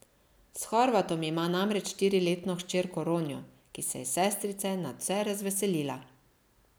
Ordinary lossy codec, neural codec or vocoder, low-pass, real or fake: none; none; none; real